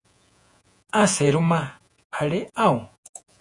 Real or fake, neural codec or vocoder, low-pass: fake; vocoder, 48 kHz, 128 mel bands, Vocos; 10.8 kHz